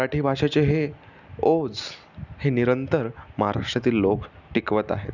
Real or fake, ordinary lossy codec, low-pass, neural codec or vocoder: real; none; 7.2 kHz; none